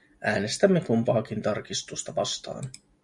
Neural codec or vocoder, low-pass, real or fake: none; 10.8 kHz; real